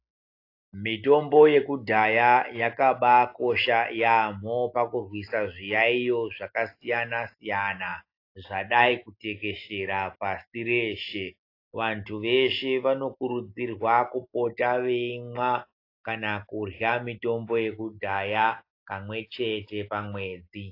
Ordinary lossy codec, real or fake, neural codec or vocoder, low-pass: AAC, 32 kbps; real; none; 5.4 kHz